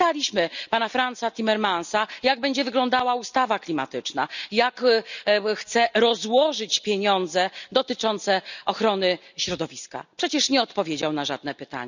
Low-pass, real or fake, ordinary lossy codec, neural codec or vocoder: 7.2 kHz; real; none; none